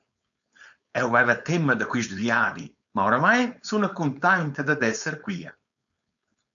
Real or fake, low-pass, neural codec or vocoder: fake; 7.2 kHz; codec, 16 kHz, 4.8 kbps, FACodec